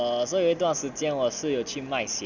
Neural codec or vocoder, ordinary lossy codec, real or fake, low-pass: none; none; real; 7.2 kHz